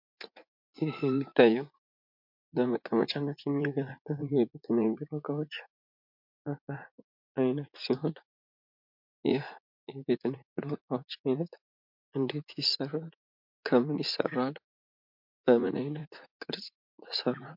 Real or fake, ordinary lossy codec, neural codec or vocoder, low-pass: fake; MP3, 48 kbps; vocoder, 44.1 kHz, 80 mel bands, Vocos; 5.4 kHz